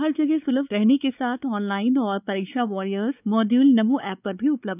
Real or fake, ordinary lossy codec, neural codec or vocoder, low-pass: fake; none; codec, 16 kHz, 4 kbps, X-Codec, WavLM features, trained on Multilingual LibriSpeech; 3.6 kHz